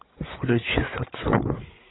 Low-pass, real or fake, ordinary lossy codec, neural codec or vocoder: 7.2 kHz; fake; AAC, 16 kbps; codec, 16 kHz, 8 kbps, FreqCodec, larger model